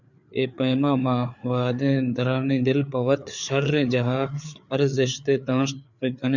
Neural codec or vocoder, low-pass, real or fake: codec, 16 kHz, 4 kbps, FreqCodec, larger model; 7.2 kHz; fake